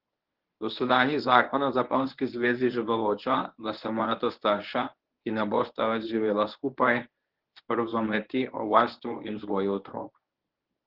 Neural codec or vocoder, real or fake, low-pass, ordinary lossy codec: codec, 24 kHz, 0.9 kbps, WavTokenizer, medium speech release version 1; fake; 5.4 kHz; Opus, 16 kbps